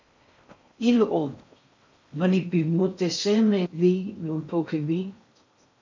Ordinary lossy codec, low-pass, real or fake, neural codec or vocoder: MP3, 64 kbps; 7.2 kHz; fake; codec, 16 kHz in and 24 kHz out, 0.6 kbps, FocalCodec, streaming, 4096 codes